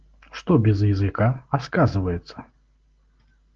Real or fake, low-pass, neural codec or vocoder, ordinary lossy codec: real; 7.2 kHz; none; Opus, 16 kbps